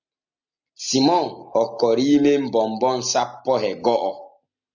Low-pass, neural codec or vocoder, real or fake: 7.2 kHz; none; real